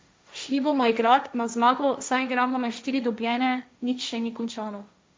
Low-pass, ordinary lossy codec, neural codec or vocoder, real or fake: none; none; codec, 16 kHz, 1.1 kbps, Voila-Tokenizer; fake